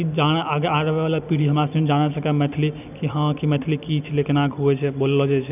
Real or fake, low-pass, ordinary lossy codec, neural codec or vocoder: real; 3.6 kHz; none; none